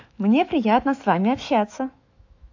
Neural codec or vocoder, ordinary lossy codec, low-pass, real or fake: autoencoder, 48 kHz, 128 numbers a frame, DAC-VAE, trained on Japanese speech; AAC, 48 kbps; 7.2 kHz; fake